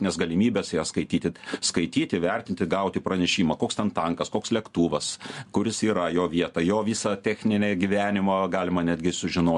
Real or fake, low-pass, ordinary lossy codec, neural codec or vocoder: real; 10.8 kHz; MP3, 64 kbps; none